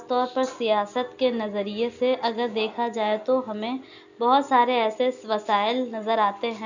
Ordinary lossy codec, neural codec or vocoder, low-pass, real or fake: none; none; 7.2 kHz; real